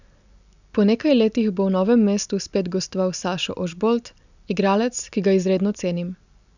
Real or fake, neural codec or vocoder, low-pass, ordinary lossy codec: real; none; 7.2 kHz; none